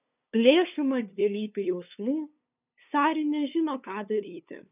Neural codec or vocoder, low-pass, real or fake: codec, 16 kHz, 2 kbps, FunCodec, trained on LibriTTS, 25 frames a second; 3.6 kHz; fake